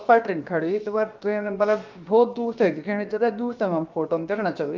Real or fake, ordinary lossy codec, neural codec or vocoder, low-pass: fake; Opus, 24 kbps; codec, 16 kHz, about 1 kbps, DyCAST, with the encoder's durations; 7.2 kHz